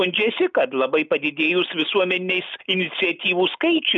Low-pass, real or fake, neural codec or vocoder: 7.2 kHz; real; none